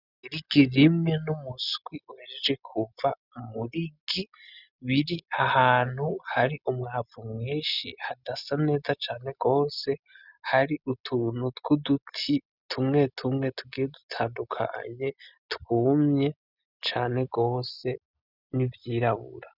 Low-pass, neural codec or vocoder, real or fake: 5.4 kHz; none; real